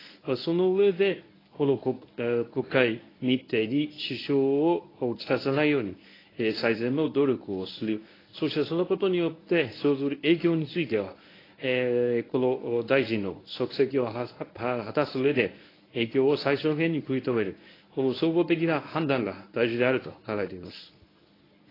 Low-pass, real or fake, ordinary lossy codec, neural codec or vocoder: 5.4 kHz; fake; AAC, 24 kbps; codec, 24 kHz, 0.9 kbps, WavTokenizer, medium speech release version 1